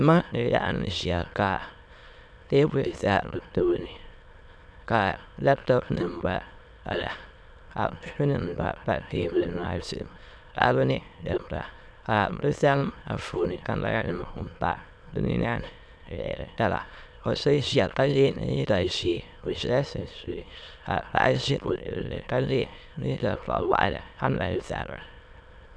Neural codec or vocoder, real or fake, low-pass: autoencoder, 22.05 kHz, a latent of 192 numbers a frame, VITS, trained on many speakers; fake; 9.9 kHz